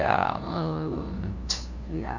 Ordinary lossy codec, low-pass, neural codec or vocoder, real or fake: none; 7.2 kHz; codec, 16 kHz, 0.5 kbps, FunCodec, trained on LibriTTS, 25 frames a second; fake